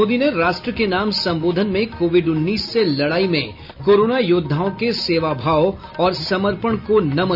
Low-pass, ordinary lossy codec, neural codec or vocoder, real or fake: 5.4 kHz; none; none; real